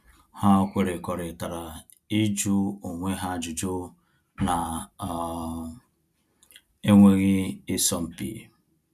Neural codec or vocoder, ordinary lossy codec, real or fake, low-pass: none; none; real; 14.4 kHz